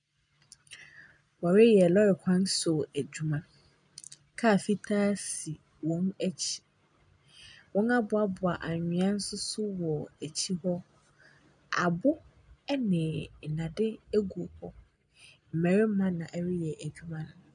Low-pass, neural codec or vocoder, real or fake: 9.9 kHz; none; real